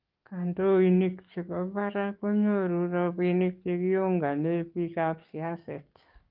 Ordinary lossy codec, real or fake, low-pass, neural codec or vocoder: Opus, 24 kbps; fake; 5.4 kHz; codec, 44.1 kHz, 7.8 kbps, DAC